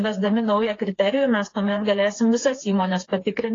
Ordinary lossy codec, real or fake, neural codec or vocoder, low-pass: AAC, 32 kbps; fake; codec, 16 kHz, 4 kbps, FreqCodec, smaller model; 7.2 kHz